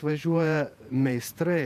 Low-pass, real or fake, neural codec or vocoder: 14.4 kHz; fake; vocoder, 48 kHz, 128 mel bands, Vocos